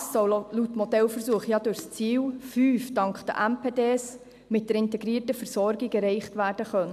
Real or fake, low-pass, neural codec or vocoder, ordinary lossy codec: real; 14.4 kHz; none; MP3, 96 kbps